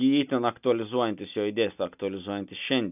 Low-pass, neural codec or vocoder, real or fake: 3.6 kHz; none; real